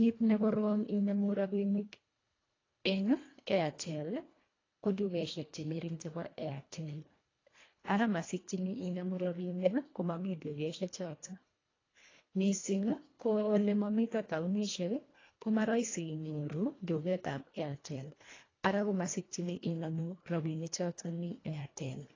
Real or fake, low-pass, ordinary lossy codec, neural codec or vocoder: fake; 7.2 kHz; AAC, 32 kbps; codec, 24 kHz, 1.5 kbps, HILCodec